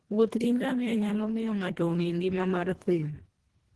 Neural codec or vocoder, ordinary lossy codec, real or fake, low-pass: codec, 24 kHz, 1.5 kbps, HILCodec; Opus, 16 kbps; fake; 10.8 kHz